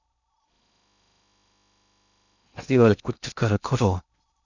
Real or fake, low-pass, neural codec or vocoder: fake; 7.2 kHz; codec, 16 kHz in and 24 kHz out, 0.6 kbps, FocalCodec, streaming, 2048 codes